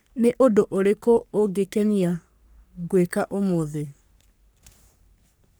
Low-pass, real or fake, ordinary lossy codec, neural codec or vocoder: none; fake; none; codec, 44.1 kHz, 3.4 kbps, Pupu-Codec